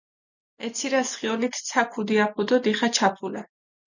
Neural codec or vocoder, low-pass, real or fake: none; 7.2 kHz; real